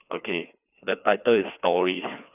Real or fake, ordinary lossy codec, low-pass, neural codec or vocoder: fake; none; 3.6 kHz; codec, 16 kHz, 2 kbps, FreqCodec, larger model